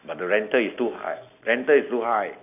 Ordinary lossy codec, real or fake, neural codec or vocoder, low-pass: none; real; none; 3.6 kHz